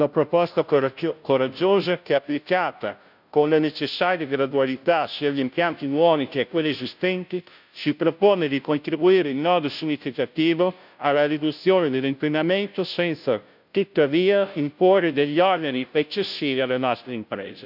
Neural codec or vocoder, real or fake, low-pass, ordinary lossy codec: codec, 16 kHz, 0.5 kbps, FunCodec, trained on Chinese and English, 25 frames a second; fake; 5.4 kHz; none